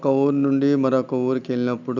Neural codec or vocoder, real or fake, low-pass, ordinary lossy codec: none; real; 7.2 kHz; none